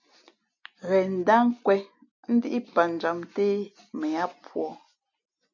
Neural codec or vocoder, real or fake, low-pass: none; real; 7.2 kHz